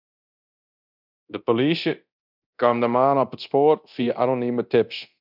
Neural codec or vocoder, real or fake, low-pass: codec, 24 kHz, 0.9 kbps, DualCodec; fake; 5.4 kHz